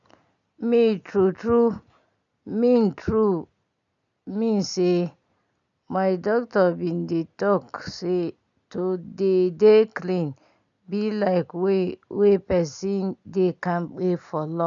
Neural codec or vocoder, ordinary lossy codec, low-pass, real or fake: none; none; 7.2 kHz; real